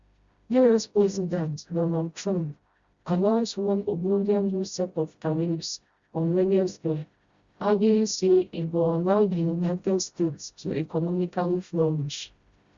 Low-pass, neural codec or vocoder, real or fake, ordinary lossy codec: 7.2 kHz; codec, 16 kHz, 0.5 kbps, FreqCodec, smaller model; fake; Opus, 64 kbps